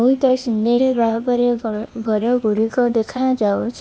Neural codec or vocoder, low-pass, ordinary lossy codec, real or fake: codec, 16 kHz, 0.8 kbps, ZipCodec; none; none; fake